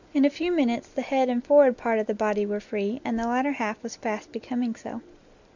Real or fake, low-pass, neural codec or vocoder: real; 7.2 kHz; none